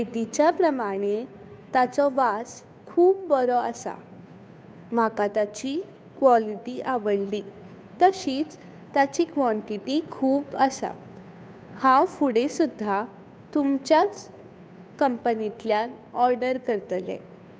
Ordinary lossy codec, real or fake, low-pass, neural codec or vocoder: none; fake; none; codec, 16 kHz, 2 kbps, FunCodec, trained on Chinese and English, 25 frames a second